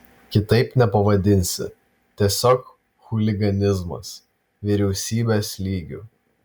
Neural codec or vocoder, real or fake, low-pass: none; real; 19.8 kHz